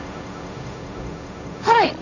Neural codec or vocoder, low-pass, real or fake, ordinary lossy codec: codec, 16 kHz, 1.1 kbps, Voila-Tokenizer; 7.2 kHz; fake; none